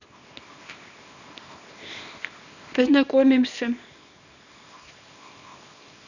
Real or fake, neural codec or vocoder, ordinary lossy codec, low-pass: fake; codec, 24 kHz, 0.9 kbps, WavTokenizer, small release; none; 7.2 kHz